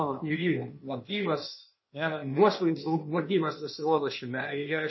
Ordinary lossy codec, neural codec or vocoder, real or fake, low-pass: MP3, 24 kbps; codec, 16 kHz, 0.8 kbps, ZipCodec; fake; 7.2 kHz